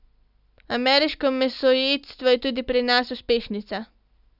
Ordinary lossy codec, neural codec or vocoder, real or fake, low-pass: none; none; real; 5.4 kHz